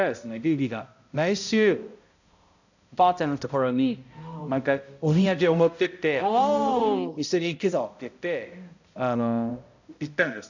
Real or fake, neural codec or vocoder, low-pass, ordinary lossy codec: fake; codec, 16 kHz, 0.5 kbps, X-Codec, HuBERT features, trained on balanced general audio; 7.2 kHz; none